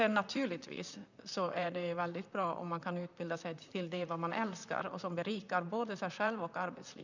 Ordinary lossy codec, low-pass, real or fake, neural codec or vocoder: none; 7.2 kHz; fake; vocoder, 44.1 kHz, 128 mel bands, Pupu-Vocoder